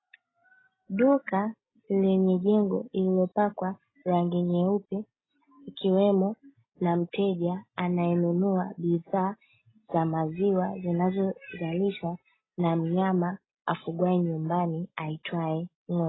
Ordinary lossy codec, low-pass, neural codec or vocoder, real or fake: AAC, 16 kbps; 7.2 kHz; none; real